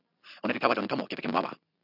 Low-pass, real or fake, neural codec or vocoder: 5.4 kHz; real; none